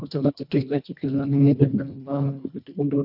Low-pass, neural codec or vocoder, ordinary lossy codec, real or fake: 5.4 kHz; codec, 24 kHz, 1.5 kbps, HILCodec; none; fake